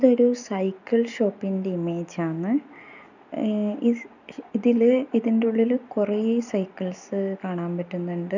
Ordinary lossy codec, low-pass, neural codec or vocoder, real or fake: none; 7.2 kHz; none; real